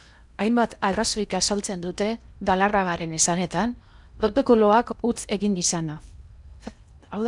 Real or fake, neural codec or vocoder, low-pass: fake; codec, 16 kHz in and 24 kHz out, 0.8 kbps, FocalCodec, streaming, 65536 codes; 10.8 kHz